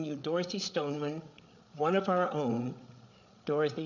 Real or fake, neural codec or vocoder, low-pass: fake; codec, 16 kHz, 8 kbps, FreqCodec, larger model; 7.2 kHz